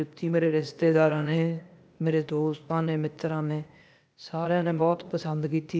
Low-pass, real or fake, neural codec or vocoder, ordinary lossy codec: none; fake; codec, 16 kHz, 0.8 kbps, ZipCodec; none